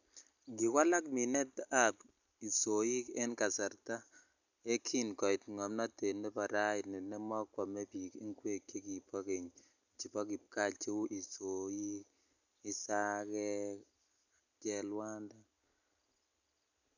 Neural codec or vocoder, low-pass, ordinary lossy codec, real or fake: none; 7.2 kHz; none; real